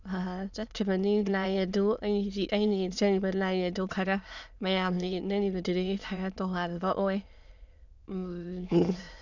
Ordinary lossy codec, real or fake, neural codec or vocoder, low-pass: none; fake; autoencoder, 22.05 kHz, a latent of 192 numbers a frame, VITS, trained on many speakers; 7.2 kHz